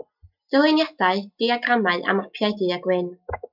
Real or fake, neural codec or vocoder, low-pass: real; none; 5.4 kHz